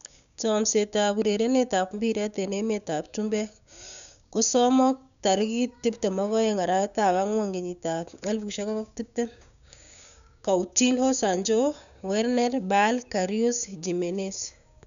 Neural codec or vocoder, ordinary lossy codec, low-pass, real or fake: codec, 16 kHz, 6 kbps, DAC; none; 7.2 kHz; fake